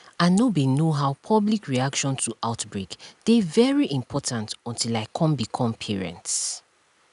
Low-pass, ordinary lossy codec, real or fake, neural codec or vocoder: 10.8 kHz; none; real; none